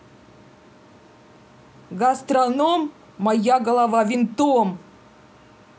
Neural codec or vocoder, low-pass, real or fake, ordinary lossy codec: none; none; real; none